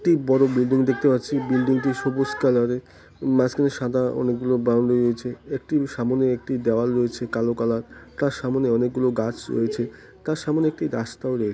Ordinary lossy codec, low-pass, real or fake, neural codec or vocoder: none; none; real; none